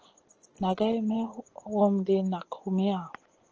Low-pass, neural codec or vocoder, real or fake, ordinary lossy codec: 7.2 kHz; vocoder, 24 kHz, 100 mel bands, Vocos; fake; Opus, 16 kbps